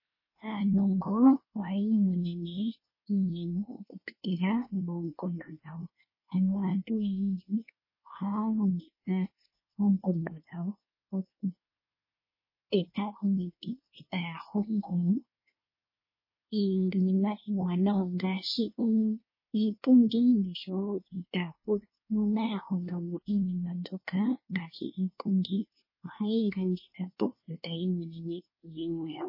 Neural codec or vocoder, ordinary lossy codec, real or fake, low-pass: codec, 24 kHz, 1 kbps, SNAC; MP3, 24 kbps; fake; 5.4 kHz